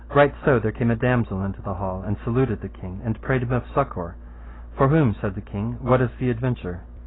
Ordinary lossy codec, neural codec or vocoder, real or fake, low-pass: AAC, 16 kbps; none; real; 7.2 kHz